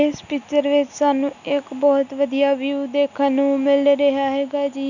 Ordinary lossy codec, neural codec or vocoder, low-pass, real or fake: none; none; 7.2 kHz; real